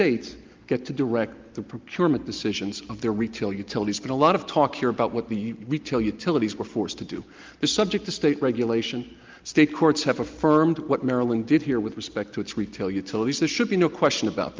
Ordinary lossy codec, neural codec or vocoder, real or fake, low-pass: Opus, 32 kbps; none; real; 7.2 kHz